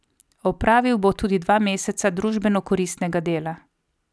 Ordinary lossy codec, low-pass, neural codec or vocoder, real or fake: none; none; none; real